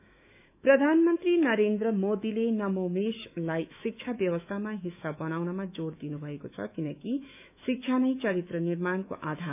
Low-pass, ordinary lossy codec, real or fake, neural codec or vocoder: 3.6 kHz; none; fake; autoencoder, 48 kHz, 128 numbers a frame, DAC-VAE, trained on Japanese speech